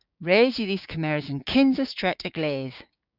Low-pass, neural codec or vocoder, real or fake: 5.4 kHz; codec, 16 kHz, 6 kbps, DAC; fake